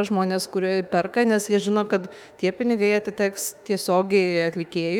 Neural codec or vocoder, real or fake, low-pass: autoencoder, 48 kHz, 32 numbers a frame, DAC-VAE, trained on Japanese speech; fake; 19.8 kHz